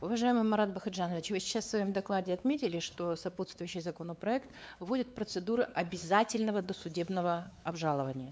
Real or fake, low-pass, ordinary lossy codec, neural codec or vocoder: fake; none; none; codec, 16 kHz, 4 kbps, X-Codec, WavLM features, trained on Multilingual LibriSpeech